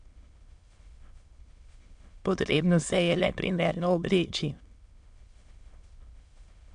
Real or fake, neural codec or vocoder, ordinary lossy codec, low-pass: fake; autoencoder, 22.05 kHz, a latent of 192 numbers a frame, VITS, trained on many speakers; AAC, 64 kbps; 9.9 kHz